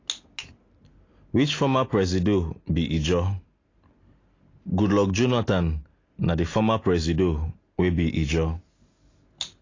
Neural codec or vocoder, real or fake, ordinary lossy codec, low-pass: none; real; AAC, 32 kbps; 7.2 kHz